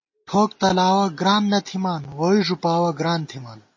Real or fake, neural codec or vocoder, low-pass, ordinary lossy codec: real; none; 7.2 kHz; MP3, 32 kbps